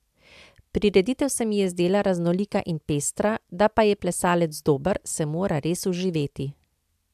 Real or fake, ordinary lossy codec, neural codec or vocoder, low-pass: real; AAC, 96 kbps; none; 14.4 kHz